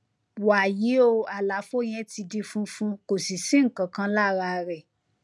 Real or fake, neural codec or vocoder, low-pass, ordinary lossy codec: real; none; none; none